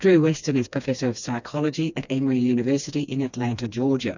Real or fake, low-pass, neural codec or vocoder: fake; 7.2 kHz; codec, 16 kHz, 2 kbps, FreqCodec, smaller model